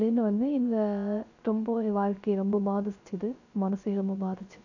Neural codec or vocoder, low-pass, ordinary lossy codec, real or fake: codec, 16 kHz, 0.3 kbps, FocalCodec; 7.2 kHz; none; fake